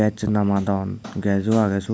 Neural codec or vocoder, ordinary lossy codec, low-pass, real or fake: none; none; none; real